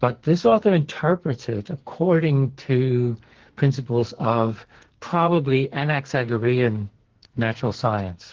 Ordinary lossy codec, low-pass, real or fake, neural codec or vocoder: Opus, 16 kbps; 7.2 kHz; fake; codec, 44.1 kHz, 2.6 kbps, SNAC